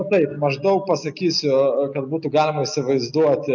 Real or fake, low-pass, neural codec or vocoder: real; 7.2 kHz; none